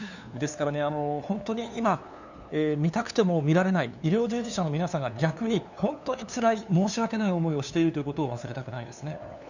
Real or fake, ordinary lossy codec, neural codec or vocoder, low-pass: fake; none; codec, 16 kHz, 2 kbps, FunCodec, trained on LibriTTS, 25 frames a second; 7.2 kHz